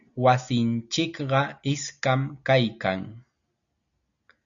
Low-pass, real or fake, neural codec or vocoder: 7.2 kHz; real; none